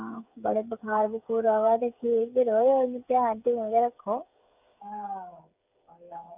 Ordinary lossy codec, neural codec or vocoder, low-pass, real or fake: none; codec, 16 kHz, 8 kbps, FreqCodec, smaller model; 3.6 kHz; fake